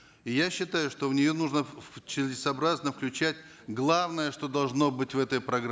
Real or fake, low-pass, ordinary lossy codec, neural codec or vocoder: real; none; none; none